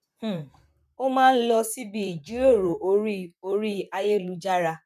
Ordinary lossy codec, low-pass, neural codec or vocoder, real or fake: none; 14.4 kHz; vocoder, 44.1 kHz, 128 mel bands, Pupu-Vocoder; fake